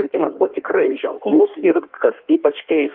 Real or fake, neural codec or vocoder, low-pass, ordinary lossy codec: fake; codec, 16 kHz in and 24 kHz out, 1.1 kbps, FireRedTTS-2 codec; 5.4 kHz; Opus, 24 kbps